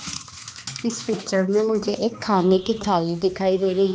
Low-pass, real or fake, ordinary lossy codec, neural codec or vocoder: none; fake; none; codec, 16 kHz, 2 kbps, X-Codec, HuBERT features, trained on balanced general audio